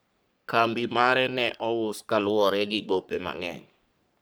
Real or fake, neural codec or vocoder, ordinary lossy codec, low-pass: fake; codec, 44.1 kHz, 3.4 kbps, Pupu-Codec; none; none